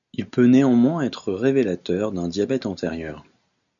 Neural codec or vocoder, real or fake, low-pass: none; real; 7.2 kHz